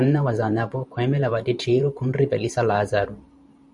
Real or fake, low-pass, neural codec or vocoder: fake; 10.8 kHz; vocoder, 44.1 kHz, 128 mel bands every 512 samples, BigVGAN v2